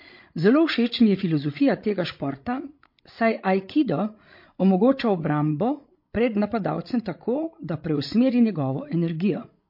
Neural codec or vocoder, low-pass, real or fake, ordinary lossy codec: codec, 16 kHz, 16 kbps, FreqCodec, larger model; 5.4 kHz; fake; MP3, 32 kbps